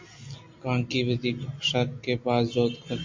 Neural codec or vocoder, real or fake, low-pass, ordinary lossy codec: none; real; 7.2 kHz; MP3, 48 kbps